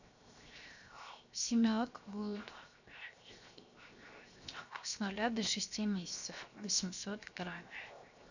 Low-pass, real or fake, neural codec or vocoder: 7.2 kHz; fake; codec, 16 kHz, 0.7 kbps, FocalCodec